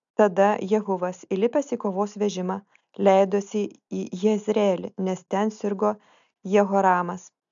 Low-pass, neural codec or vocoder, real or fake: 7.2 kHz; none; real